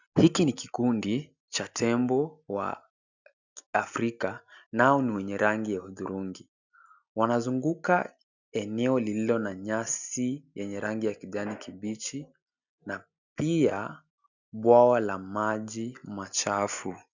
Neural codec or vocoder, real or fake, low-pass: none; real; 7.2 kHz